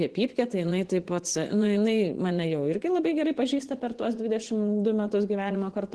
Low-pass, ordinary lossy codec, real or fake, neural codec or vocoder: 10.8 kHz; Opus, 16 kbps; fake; vocoder, 24 kHz, 100 mel bands, Vocos